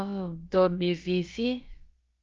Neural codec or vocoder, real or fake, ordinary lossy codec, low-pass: codec, 16 kHz, about 1 kbps, DyCAST, with the encoder's durations; fake; Opus, 32 kbps; 7.2 kHz